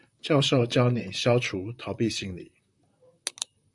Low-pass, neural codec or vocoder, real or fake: 10.8 kHz; vocoder, 44.1 kHz, 128 mel bands, Pupu-Vocoder; fake